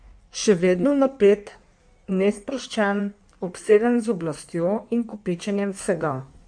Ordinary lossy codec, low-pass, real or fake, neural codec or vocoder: MP3, 64 kbps; 9.9 kHz; fake; codec, 16 kHz in and 24 kHz out, 1.1 kbps, FireRedTTS-2 codec